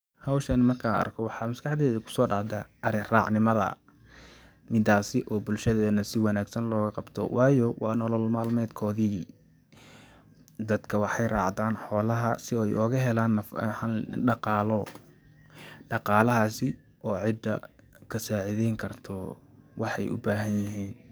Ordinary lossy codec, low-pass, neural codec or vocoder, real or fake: none; none; codec, 44.1 kHz, 7.8 kbps, DAC; fake